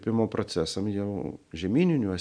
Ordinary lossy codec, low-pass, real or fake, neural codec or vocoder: MP3, 96 kbps; 9.9 kHz; real; none